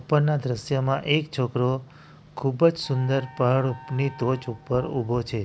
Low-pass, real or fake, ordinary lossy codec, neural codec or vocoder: none; real; none; none